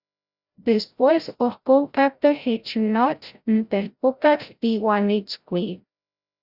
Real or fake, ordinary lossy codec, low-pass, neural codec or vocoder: fake; Opus, 64 kbps; 5.4 kHz; codec, 16 kHz, 0.5 kbps, FreqCodec, larger model